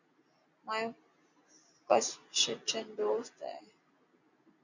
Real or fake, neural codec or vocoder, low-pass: real; none; 7.2 kHz